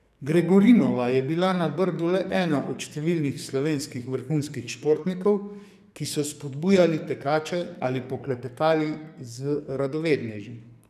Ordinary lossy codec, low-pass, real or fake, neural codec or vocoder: none; 14.4 kHz; fake; codec, 44.1 kHz, 2.6 kbps, SNAC